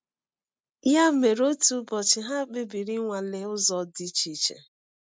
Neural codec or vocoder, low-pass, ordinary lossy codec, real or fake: none; none; none; real